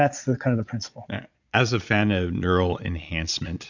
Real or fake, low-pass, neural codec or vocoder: fake; 7.2 kHz; vocoder, 22.05 kHz, 80 mel bands, Vocos